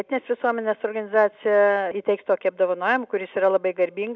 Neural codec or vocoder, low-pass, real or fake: none; 7.2 kHz; real